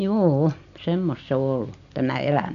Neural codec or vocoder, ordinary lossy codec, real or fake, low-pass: none; none; real; 7.2 kHz